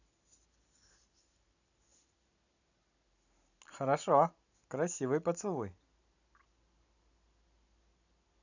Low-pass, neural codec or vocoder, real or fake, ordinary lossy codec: 7.2 kHz; none; real; none